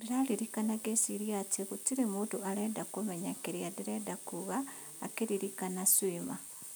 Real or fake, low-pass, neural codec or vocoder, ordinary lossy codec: real; none; none; none